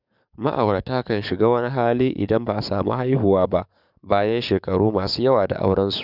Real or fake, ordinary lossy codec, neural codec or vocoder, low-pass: fake; none; codec, 44.1 kHz, 7.8 kbps, DAC; 5.4 kHz